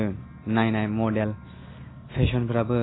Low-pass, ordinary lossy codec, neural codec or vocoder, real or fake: 7.2 kHz; AAC, 16 kbps; none; real